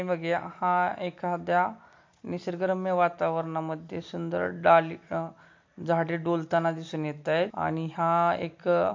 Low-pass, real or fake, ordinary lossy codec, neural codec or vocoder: 7.2 kHz; real; MP3, 48 kbps; none